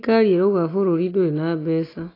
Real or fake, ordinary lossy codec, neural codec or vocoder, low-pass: real; AAC, 24 kbps; none; 5.4 kHz